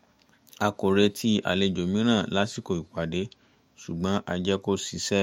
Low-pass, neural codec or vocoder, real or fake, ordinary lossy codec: 19.8 kHz; autoencoder, 48 kHz, 128 numbers a frame, DAC-VAE, trained on Japanese speech; fake; MP3, 64 kbps